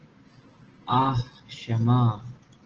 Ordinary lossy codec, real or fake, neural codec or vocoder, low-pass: Opus, 16 kbps; real; none; 7.2 kHz